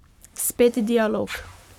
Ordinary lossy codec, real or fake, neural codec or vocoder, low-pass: none; fake; codec, 44.1 kHz, 7.8 kbps, Pupu-Codec; 19.8 kHz